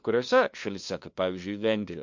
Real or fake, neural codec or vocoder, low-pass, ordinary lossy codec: fake; codec, 16 kHz, 2 kbps, FunCodec, trained on LibriTTS, 25 frames a second; 7.2 kHz; MP3, 48 kbps